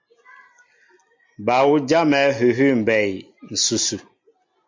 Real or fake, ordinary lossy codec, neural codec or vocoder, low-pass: real; MP3, 48 kbps; none; 7.2 kHz